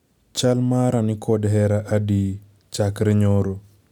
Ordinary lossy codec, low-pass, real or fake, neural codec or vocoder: none; 19.8 kHz; real; none